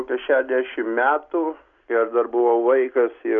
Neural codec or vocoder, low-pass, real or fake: none; 7.2 kHz; real